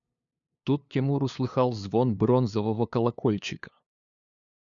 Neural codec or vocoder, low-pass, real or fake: codec, 16 kHz, 2 kbps, FunCodec, trained on LibriTTS, 25 frames a second; 7.2 kHz; fake